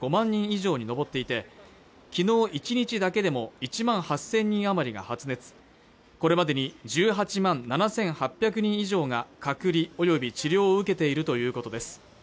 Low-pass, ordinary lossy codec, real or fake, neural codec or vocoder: none; none; real; none